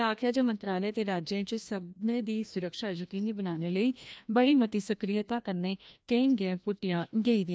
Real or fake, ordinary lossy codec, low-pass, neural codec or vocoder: fake; none; none; codec, 16 kHz, 1 kbps, FreqCodec, larger model